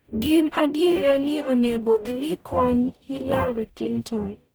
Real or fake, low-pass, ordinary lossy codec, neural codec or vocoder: fake; none; none; codec, 44.1 kHz, 0.9 kbps, DAC